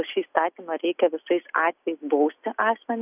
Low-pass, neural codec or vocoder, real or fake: 3.6 kHz; none; real